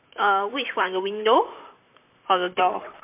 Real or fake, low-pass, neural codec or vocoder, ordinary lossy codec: fake; 3.6 kHz; vocoder, 44.1 kHz, 128 mel bands, Pupu-Vocoder; MP3, 32 kbps